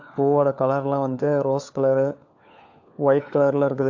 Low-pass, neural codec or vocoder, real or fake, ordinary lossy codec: 7.2 kHz; codec, 16 kHz, 4 kbps, FunCodec, trained on LibriTTS, 50 frames a second; fake; AAC, 48 kbps